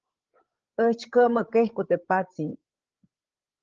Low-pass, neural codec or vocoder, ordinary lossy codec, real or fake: 7.2 kHz; codec, 16 kHz, 8 kbps, FreqCodec, larger model; Opus, 16 kbps; fake